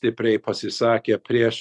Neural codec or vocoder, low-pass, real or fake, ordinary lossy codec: none; 10.8 kHz; real; Opus, 64 kbps